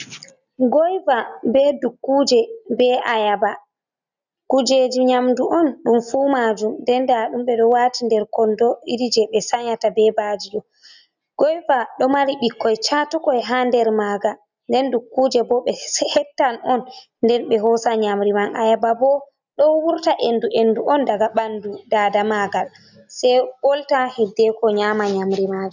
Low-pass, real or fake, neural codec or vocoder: 7.2 kHz; real; none